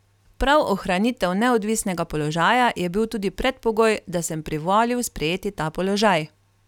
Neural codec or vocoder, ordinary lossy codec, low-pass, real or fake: none; none; 19.8 kHz; real